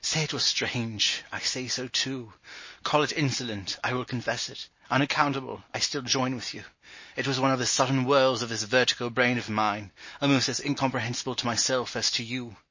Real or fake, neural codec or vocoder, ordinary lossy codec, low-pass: real; none; MP3, 32 kbps; 7.2 kHz